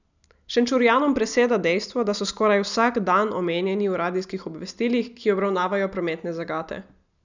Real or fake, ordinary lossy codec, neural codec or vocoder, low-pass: real; none; none; 7.2 kHz